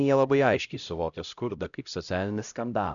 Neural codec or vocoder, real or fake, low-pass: codec, 16 kHz, 0.5 kbps, X-Codec, HuBERT features, trained on LibriSpeech; fake; 7.2 kHz